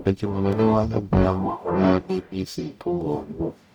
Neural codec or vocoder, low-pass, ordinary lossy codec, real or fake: codec, 44.1 kHz, 0.9 kbps, DAC; 19.8 kHz; none; fake